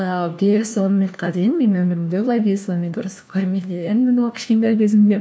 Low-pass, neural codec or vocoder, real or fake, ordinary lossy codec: none; codec, 16 kHz, 1 kbps, FunCodec, trained on LibriTTS, 50 frames a second; fake; none